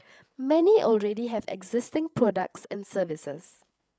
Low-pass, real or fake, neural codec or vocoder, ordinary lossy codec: none; fake; codec, 16 kHz, 8 kbps, FreqCodec, larger model; none